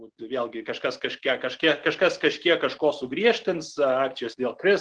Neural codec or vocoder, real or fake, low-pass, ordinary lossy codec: none; real; 9.9 kHz; Opus, 16 kbps